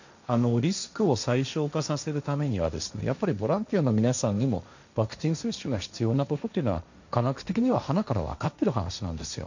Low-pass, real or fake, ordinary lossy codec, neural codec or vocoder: 7.2 kHz; fake; none; codec, 16 kHz, 1.1 kbps, Voila-Tokenizer